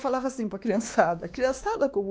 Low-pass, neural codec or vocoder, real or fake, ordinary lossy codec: none; codec, 16 kHz, 2 kbps, X-Codec, WavLM features, trained on Multilingual LibriSpeech; fake; none